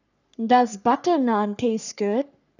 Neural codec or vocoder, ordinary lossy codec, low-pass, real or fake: codec, 44.1 kHz, 3.4 kbps, Pupu-Codec; none; 7.2 kHz; fake